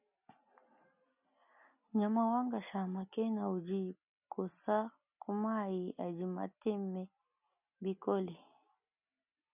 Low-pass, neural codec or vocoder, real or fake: 3.6 kHz; none; real